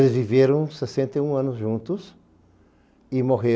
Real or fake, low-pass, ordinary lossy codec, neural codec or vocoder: real; none; none; none